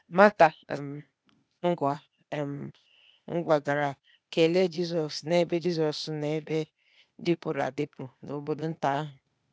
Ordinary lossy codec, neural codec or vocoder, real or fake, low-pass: none; codec, 16 kHz, 0.8 kbps, ZipCodec; fake; none